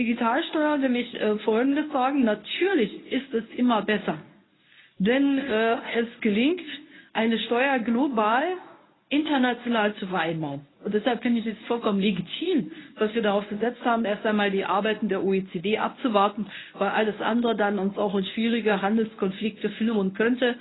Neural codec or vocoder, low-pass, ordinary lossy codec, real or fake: codec, 24 kHz, 0.9 kbps, WavTokenizer, medium speech release version 1; 7.2 kHz; AAC, 16 kbps; fake